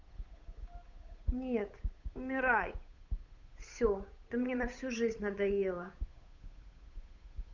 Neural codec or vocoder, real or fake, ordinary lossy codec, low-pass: codec, 16 kHz, 8 kbps, FunCodec, trained on Chinese and English, 25 frames a second; fake; none; 7.2 kHz